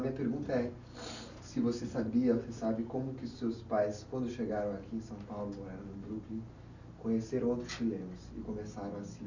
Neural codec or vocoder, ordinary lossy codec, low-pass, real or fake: none; none; 7.2 kHz; real